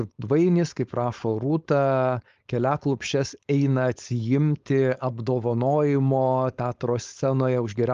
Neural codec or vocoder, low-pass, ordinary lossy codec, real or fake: codec, 16 kHz, 4.8 kbps, FACodec; 7.2 kHz; Opus, 32 kbps; fake